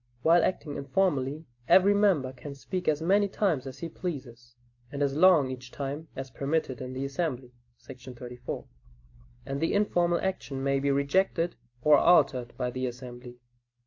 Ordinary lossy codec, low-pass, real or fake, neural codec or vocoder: AAC, 48 kbps; 7.2 kHz; real; none